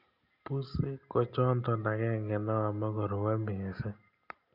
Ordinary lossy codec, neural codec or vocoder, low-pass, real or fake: none; none; 5.4 kHz; real